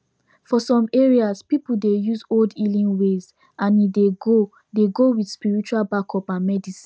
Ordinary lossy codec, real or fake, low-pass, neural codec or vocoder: none; real; none; none